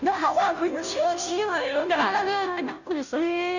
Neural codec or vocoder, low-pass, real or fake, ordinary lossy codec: codec, 16 kHz, 0.5 kbps, FunCodec, trained on Chinese and English, 25 frames a second; 7.2 kHz; fake; none